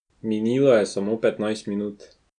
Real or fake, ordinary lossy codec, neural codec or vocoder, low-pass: real; none; none; 9.9 kHz